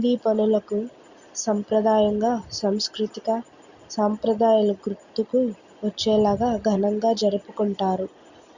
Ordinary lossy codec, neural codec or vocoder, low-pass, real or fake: Opus, 64 kbps; none; 7.2 kHz; real